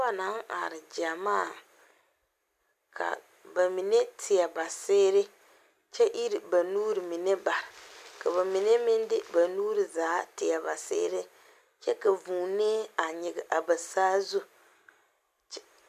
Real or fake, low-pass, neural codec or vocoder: real; 14.4 kHz; none